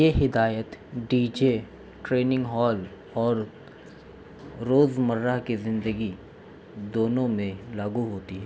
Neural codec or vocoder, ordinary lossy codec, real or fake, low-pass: none; none; real; none